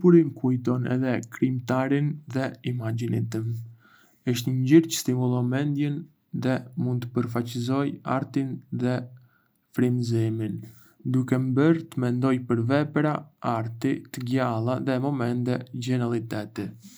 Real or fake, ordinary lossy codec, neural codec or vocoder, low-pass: real; none; none; none